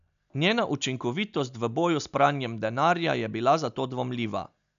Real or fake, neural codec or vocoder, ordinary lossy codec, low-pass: real; none; none; 7.2 kHz